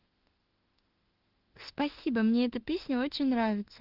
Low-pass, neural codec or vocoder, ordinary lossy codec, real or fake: 5.4 kHz; autoencoder, 48 kHz, 32 numbers a frame, DAC-VAE, trained on Japanese speech; Opus, 16 kbps; fake